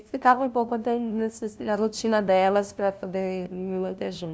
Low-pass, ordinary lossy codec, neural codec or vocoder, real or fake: none; none; codec, 16 kHz, 0.5 kbps, FunCodec, trained on LibriTTS, 25 frames a second; fake